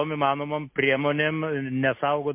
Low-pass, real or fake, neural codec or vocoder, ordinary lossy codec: 3.6 kHz; real; none; MP3, 24 kbps